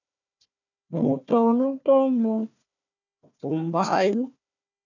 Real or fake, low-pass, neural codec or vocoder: fake; 7.2 kHz; codec, 16 kHz, 1 kbps, FunCodec, trained on Chinese and English, 50 frames a second